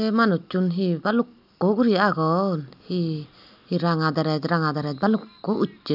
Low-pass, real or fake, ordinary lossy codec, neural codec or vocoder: 5.4 kHz; real; none; none